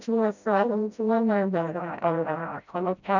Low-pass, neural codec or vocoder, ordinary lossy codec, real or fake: 7.2 kHz; codec, 16 kHz, 0.5 kbps, FreqCodec, smaller model; none; fake